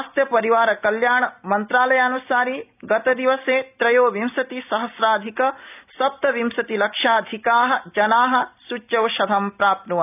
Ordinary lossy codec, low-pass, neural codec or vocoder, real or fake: none; 3.6 kHz; none; real